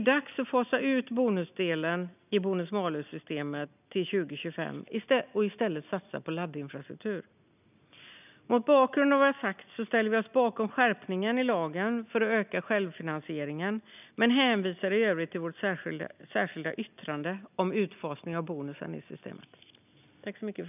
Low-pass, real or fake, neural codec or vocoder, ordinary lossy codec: 3.6 kHz; real; none; none